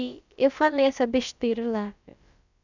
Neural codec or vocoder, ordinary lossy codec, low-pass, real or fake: codec, 16 kHz, about 1 kbps, DyCAST, with the encoder's durations; none; 7.2 kHz; fake